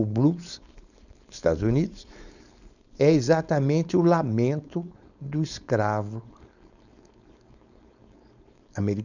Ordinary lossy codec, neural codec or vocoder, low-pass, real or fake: none; codec, 16 kHz, 4.8 kbps, FACodec; 7.2 kHz; fake